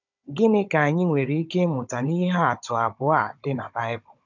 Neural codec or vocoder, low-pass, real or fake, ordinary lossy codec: codec, 16 kHz, 16 kbps, FunCodec, trained on Chinese and English, 50 frames a second; 7.2 kHz; fake; none